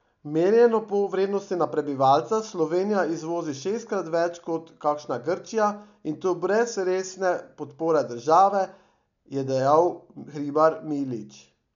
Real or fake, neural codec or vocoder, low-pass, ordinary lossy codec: real; none; 7.2 kHz; none